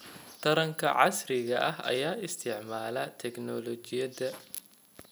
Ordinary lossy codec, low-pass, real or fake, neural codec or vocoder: none; none; real; none